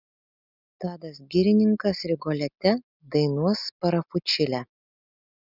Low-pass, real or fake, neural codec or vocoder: 5.4 kHz; real; none